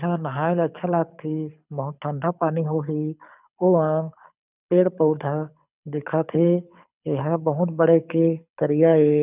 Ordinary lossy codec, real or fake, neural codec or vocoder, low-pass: none; fake; codec, 24 kHz, 6 kbps, HILCodec; 3.6 kHz